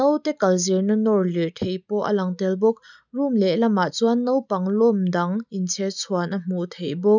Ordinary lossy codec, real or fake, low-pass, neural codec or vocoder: none; real; 7.2 kHz; none